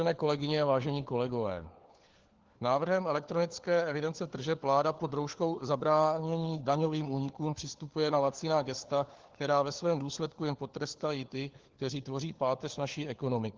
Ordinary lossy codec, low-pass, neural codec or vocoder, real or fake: Opus, 16 kbps; 7.2 kHz; codec, 16 kHz, 4 kbps, FunCodec, trained on LibriTTS, 50 frames a second; fake